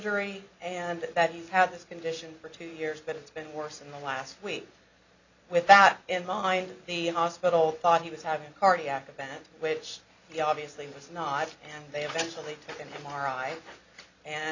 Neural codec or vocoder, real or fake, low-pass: none; real; 7.2 kHz